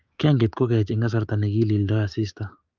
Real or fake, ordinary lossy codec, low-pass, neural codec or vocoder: fake; Opus, 24 kbps; 7.2 kHz; codec, 16 kHz, 6 kbps, DAC